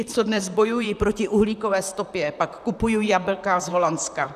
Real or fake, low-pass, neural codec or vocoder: fake; 14.4 kHz; vocoder, 44.1 kHz, 128 mel bands, Pupu-Vocoder